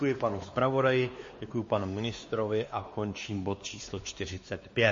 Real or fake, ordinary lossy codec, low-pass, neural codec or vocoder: fake; MP3, 32 kbps; 7.2 kHz; codec, 16 kHz, 2 kbps, X-Codec, WavLM features, trained on Multilingual LibriSpeech